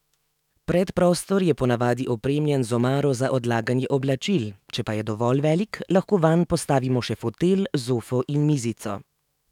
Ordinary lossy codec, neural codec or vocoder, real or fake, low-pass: none; autoencoder, 48 kHz, 128 numbers a frame, DAC-VAE, trained on Japanese speech; fake; 19.8 kHz